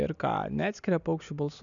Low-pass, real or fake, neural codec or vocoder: 7.2 kHz; real; none